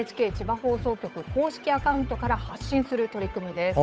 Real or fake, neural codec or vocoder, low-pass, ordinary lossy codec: fake; codec, 16 kHz, 8 kbps, FunCodec, trained on Chinese and English, 25 frames a second; none; none